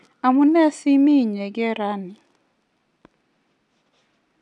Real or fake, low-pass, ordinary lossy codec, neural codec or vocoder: real; none; none; none